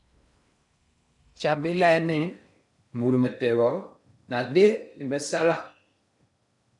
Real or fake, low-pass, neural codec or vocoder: fake; 10.8 kHz; codec, 16 kHz in and 24 kHz out, 0.6 kbps, FocalCodec, streaming, 4096 codes